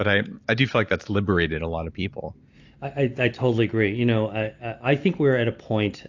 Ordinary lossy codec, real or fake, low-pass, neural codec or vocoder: AAC, 48 kbps; real; 7.2 kHz; none